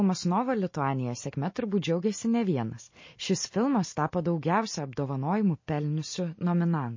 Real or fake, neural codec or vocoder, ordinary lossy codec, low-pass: real; none; MP3, 32 kbps; 7.2 kHz